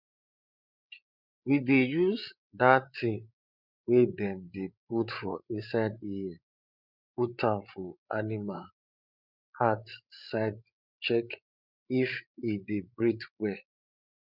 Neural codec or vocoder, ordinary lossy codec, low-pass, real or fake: codec, 16 kHz, 8 kbps, FreqCodec, larger model; none; 5.4 kHz; fake